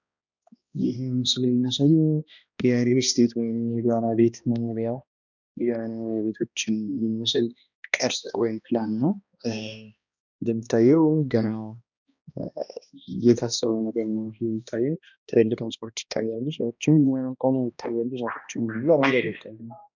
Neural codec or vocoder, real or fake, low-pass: codec, 16 kHz, 1 kbps, X-Codec, HuBERT features, trained on balanced general audio; fake; 7.2 kHz